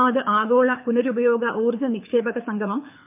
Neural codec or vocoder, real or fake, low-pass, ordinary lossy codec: codec, 24 kHz, 6 kbps, HILCodec; fake; 3.6 kHz; MP3, 32 kbps